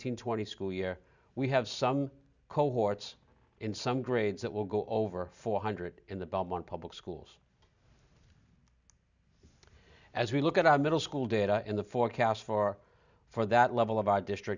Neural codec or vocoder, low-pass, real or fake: none; 7.2 kHz; real